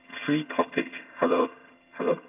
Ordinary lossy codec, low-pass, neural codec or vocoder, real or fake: AAC, 32 kbps; 3.6 kHz; vocoder, 22.05 kHz, 80 mel bands, HiFi-GAN; fake